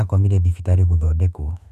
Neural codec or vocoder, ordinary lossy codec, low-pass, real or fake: autoencoder, 48 kHz, 32 numbers a frame, DAC-VAE, trained on Japanese speech; none; 14.4 kHz; fake